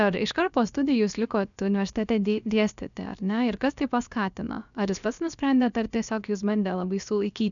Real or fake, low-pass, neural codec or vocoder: fake; 7.2 kHz; codec, 16 kHz, about 1 kbps, DyCAST, with the encoder's durations